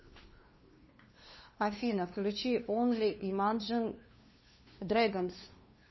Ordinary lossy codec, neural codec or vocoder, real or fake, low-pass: MP3, 24 kbps; codec, 16 kHz, 2 kbps, FunCodec, trained on LibriTTS, 25 frames a second; fake; 7.2 kHz